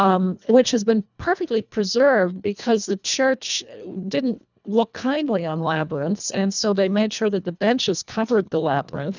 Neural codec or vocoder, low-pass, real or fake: codec, 24 kHz, 1.5 kbps, HILCodec; 7.2 kHz; fake